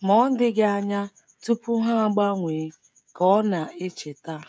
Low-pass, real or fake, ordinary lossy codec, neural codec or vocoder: none; fake; none; codec, 16 kHz, 16 kbps, FreqCodec, smaller model